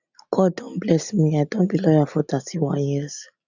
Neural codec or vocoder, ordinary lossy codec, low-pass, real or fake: none; none; 7.2 kHz; real